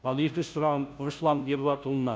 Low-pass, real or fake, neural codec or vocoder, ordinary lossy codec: none; fake; codec, 16 kHz, 0.5 kbps, FunCodec, trained on Chinese and English, 25 frames a second; none